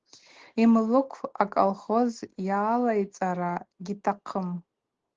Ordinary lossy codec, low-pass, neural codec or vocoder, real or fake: Opus, 16 kbps; 7.2 kHz; none; real